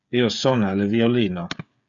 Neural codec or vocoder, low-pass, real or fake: codec, 16 kHz, 8 kbps, FreqCodec, smaller model; 7.2 kHz; fake